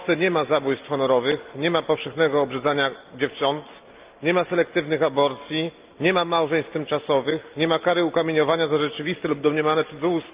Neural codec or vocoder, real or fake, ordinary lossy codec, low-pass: none; real; Opus, 32 kbps; 3.6 kHz